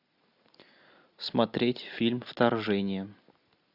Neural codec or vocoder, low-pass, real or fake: none; 5.4 kHz; real